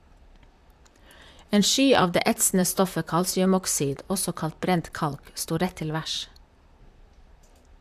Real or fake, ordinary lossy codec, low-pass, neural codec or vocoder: fake; AAC, 96 kbps; 14.4 kHz; vocoder, 44.1 kHz, 128 mel bands, Pupu-Vocoder